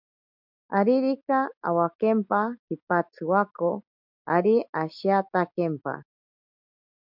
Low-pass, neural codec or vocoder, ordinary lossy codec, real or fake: 5.4 kHz; none; MP3, 48 kbps; real